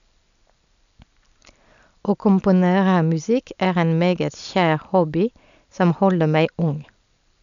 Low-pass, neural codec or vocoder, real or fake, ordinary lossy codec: 7.2 kHz; none; real; none